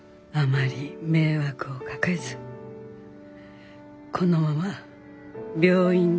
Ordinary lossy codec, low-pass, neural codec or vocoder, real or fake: none; none; none; real